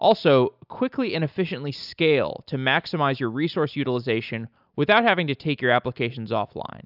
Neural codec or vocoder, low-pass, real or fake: none; 5.4 kHz; real